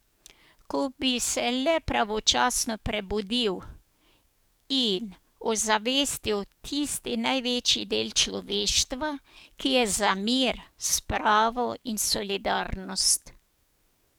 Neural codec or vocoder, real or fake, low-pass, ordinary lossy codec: codec, 44.1 kHz, 7.8 kbps, DAC; fake; none; none